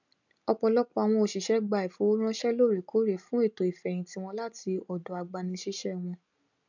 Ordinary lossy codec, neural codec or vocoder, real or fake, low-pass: none; none; real; 7.2 kHz